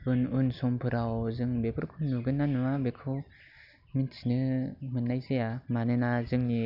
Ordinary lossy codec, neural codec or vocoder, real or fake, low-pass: none; none; real; 5.4 kHz